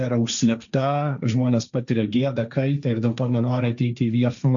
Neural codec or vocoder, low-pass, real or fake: codec, 16 kHz, 1.1 kbps, Voila-Tokenizer; 7.2 kHz; fake